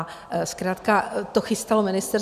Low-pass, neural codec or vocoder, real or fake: 14.4 kHz; none; real